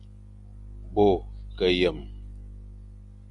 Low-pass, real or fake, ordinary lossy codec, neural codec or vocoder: 10.8 kHz; real; MP3, 96 kbps; none